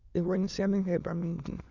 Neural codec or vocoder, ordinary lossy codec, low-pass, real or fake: autoencoder, 22.05 kHz, a latent of 192 numbers a frame, VITS, trained on many speakers; none; 7.2 kHz; fake